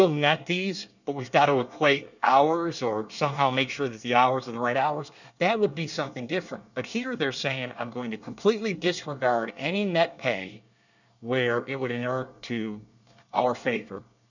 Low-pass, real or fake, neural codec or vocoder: 7.2 kHz; fake; codec, 24 kHz, 1 kbps, SNAC